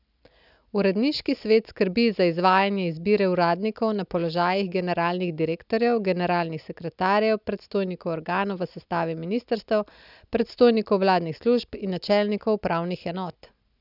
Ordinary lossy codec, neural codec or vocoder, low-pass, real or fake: none; none; 5.4 kHz; real